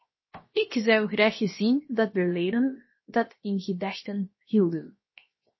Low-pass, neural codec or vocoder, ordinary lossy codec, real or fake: 7.2 kHz; codec, 16 kHz, 0.7 kbps, FocalCodec; MP3, 24 kbps; fake